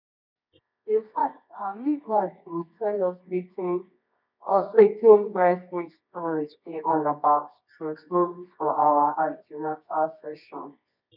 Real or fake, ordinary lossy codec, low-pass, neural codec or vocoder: fake; none; 5.4 kHz; codec, 24 kHz, 0.9 kbps, WavTokenizer, medium music audio release